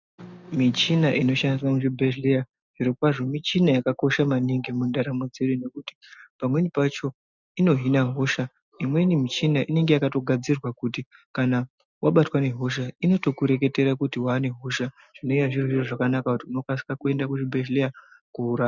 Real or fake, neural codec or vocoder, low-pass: real; none; 7.2 kHz